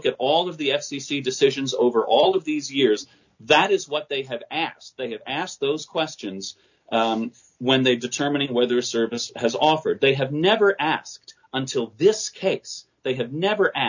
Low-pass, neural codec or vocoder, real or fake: 7.2 kHz; none; real